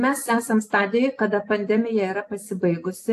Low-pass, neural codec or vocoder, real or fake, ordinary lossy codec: 14.4 kHz; none; real; AAC, 64 kbps